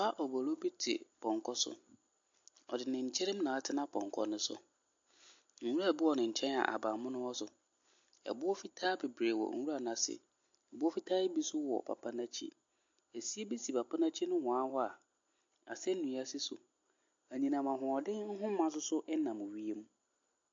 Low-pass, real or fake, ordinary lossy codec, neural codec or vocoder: 7.2 kHz; real; MP3, 48 kbps; none